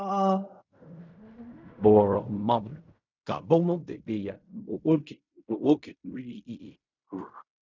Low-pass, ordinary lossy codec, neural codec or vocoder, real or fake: 7.2 kHz; none; codec, 16 kHz in and 24 kHz out, 0.4 kbps, LongCat-Audio-Codec, fine tuned four codebook decoder; fake